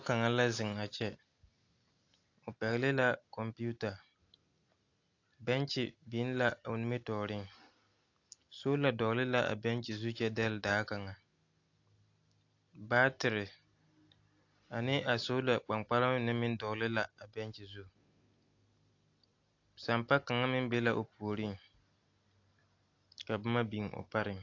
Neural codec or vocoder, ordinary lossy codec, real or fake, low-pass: none; AAC, 48 kbps; real; 7.2 kHz